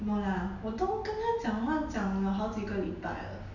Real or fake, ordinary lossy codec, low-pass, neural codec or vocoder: real; none; 7.2 kHz; none